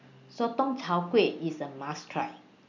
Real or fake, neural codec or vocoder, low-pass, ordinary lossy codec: real; none; 7.2 kHz; none